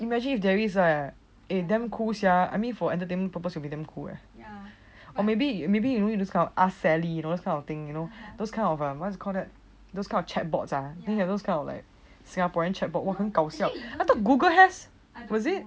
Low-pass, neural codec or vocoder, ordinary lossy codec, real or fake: none; none; none; real